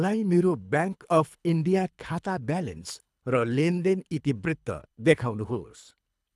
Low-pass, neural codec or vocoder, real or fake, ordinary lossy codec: none; codec, 24 kHz, 3 kbps, HILCodec; fake; none